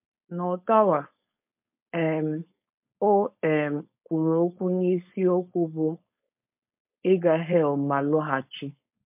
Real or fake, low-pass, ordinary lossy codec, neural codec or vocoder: fake; 3.6 kHz; MP3, 32 kbps; codec, 16 kHz, 4.8 kbps, FACodec